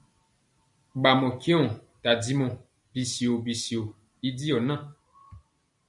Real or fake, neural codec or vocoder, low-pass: real; none; 10.8 kHz